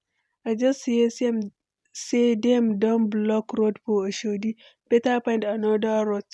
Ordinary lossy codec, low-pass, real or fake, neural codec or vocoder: none; none; real; none